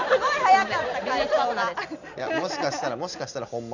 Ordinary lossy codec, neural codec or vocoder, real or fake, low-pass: none; none; real; 7.2 kHz